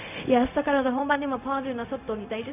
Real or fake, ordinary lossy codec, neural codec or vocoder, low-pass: fake; none; codec, 16 kHz, 0.4 kbps, LongCat-Audio-Codec; 3.6 kHz